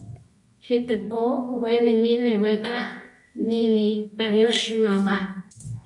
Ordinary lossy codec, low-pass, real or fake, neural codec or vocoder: MP3, 64 kbps; 10.8 kHz; fake; codec, 24 kHz, 0.9 kbps, WavTokenizer, medium music audio release